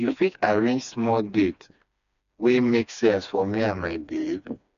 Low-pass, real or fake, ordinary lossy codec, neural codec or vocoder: 7.2 kHz; fake; none; codec, 16 kHz, 2 kbps, FreqCodec, smaller model